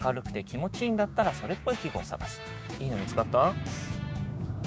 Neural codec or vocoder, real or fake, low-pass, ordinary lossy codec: codec, 16 kHz, 6 kbps, DAC; fake; none; none